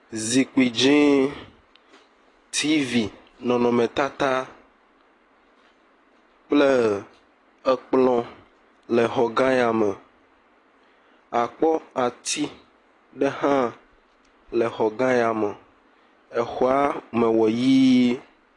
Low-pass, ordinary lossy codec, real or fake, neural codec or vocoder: 10.8 kHz; AAC, 32 kbps; real; none